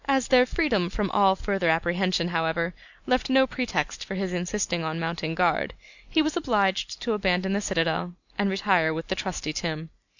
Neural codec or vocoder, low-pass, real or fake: none; 7.2 kHz; real